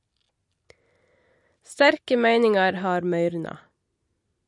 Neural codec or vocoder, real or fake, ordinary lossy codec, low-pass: none; real; MP3, 48 kbps; 10.8 kHz